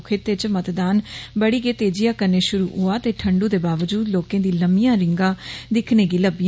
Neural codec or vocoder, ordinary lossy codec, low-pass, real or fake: none; none; none; real